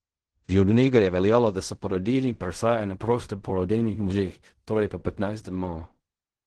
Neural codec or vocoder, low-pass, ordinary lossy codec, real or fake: codec, 16 kHz in and 24 kHz out, 0.4 kbps, LongCat-Audio-Codec, fine tuned four codebook decoder; 10.8 kHz; Opus, 16 kbps; fake